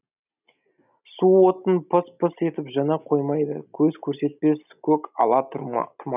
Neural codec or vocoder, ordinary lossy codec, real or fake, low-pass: none; none; real; 3.6 kHz